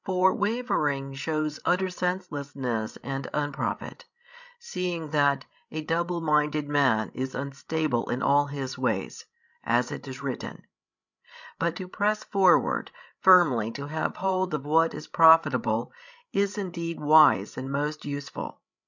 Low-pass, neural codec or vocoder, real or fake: 7.2 kHz; codec, 16 kHz, 16 kbps, FreqCodec, larger model; fake